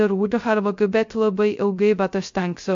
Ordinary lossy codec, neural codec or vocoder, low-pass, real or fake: MP3, 48 kbps; codec, 16 kHz, 0.2 kbps, FocalCodec; 7.2 kHz; fake